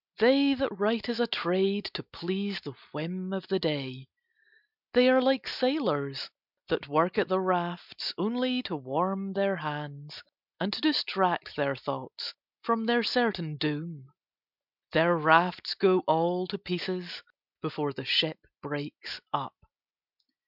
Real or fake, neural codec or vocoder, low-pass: real; none; 5.4 kHz